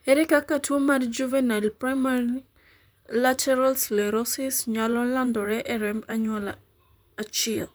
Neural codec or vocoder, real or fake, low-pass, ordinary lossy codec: vocoder, 44.1 kHz, 128 mel bands, Pupu-Vocoder; fake; none; none